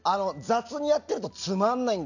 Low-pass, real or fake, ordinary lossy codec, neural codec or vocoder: 7.2 kHz; real; none; none